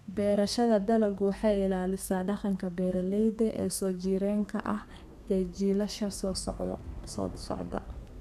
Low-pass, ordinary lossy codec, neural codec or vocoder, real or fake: 14.4 kHz; none; codec, 32 kHz, 1.9 kbps, SNAC; fake